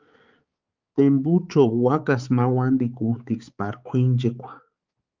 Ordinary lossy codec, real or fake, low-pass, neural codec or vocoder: Opus, 32 kbps; fake; 7.2 kHz; codec, 16 kHz, 4 kbps, X-Codec, HuBERT features, trained on balanced general audio